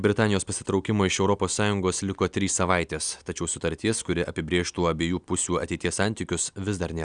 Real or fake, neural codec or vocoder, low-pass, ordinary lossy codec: real; none; 9.9 kHz; Opus, 64 kbps